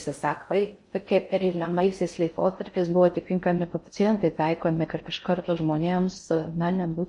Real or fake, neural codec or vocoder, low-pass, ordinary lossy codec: fake; codec, 16 kHz in and 24 kHz out, 0.6 kbps, FocalCodec, streaming, 4096 codes; 10.8 kHz; MP3, 48 kbps